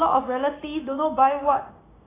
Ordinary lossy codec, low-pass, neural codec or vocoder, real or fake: AAC, 16 kbps; 3.6 kHz; codec, 16 kHz, 6 kbps, DAC; fake